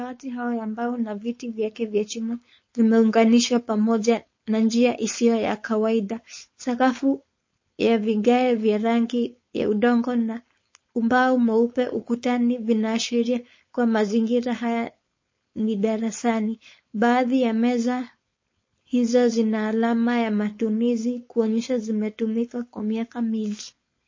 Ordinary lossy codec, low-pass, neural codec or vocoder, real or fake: MP3, 32 kbps; 7.2 kHz; codec, 16 kHz, 4.8 kbps, FACodec; fake